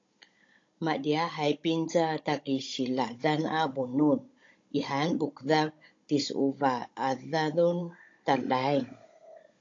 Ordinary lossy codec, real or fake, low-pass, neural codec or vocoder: AAC, 48 kbps; fake; 7.2 kHz; codec, 16 kHz, 16 kbps, FunCodec, trained on Chinese and English, 50 frames a second